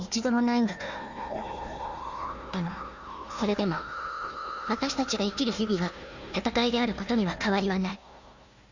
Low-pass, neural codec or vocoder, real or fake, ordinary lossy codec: 7.2 kHz; codec, 16 kHz, 1 kbps, FunCodec, trained on Chinese and English, 50 frames a second; fake; Opus, 64 kbps